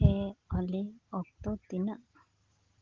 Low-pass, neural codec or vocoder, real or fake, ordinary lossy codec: 7.2 kHz; none; real; Opus, 16 kbps